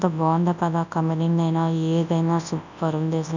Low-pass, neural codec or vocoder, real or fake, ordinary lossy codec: 7.2 kHz; codec, 24 kHz, 0.9 kbps, WavTokenizer, large speech release; fake; none